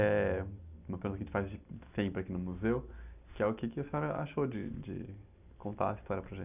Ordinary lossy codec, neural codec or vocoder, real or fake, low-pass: none; none; real; 3.6 kHz